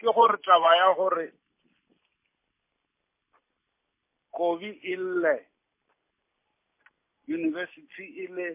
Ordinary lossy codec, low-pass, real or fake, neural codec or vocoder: MP3, 24 kbps; 3.6 kHz; real; none